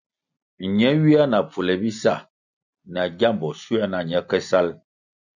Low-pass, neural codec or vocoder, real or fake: 7.2 kHz; none; real